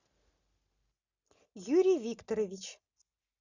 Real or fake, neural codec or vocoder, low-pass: real; none; 7.2 kHz